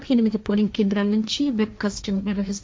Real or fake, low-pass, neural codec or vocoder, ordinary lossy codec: fake; none; codec, 16 kHz, 1.1 kbps, Voila-Tokenizer; none